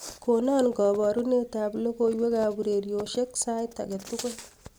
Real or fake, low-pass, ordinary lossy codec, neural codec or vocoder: real; none; none; none